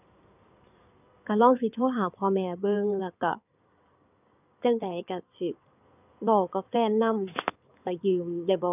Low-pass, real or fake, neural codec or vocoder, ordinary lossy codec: 3.6 kHz; fake; codec, 16 kHz in and 24 kHz out, 2.2 kbps, FireRedTTS-2 codec; none